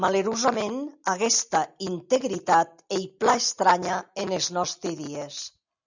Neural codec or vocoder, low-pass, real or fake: none; 7.2 kHz; real